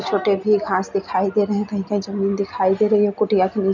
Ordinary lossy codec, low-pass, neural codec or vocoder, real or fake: none; 7.2 kHz; none; real